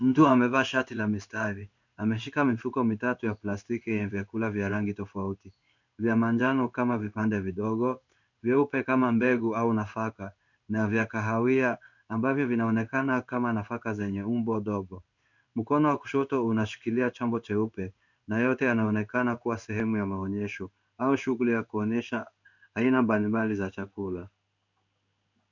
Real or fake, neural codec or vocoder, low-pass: fake; codec, 16 kHz in and 24 kHz out, 1 kbps, XY-Tokenizer; 7.2 kHz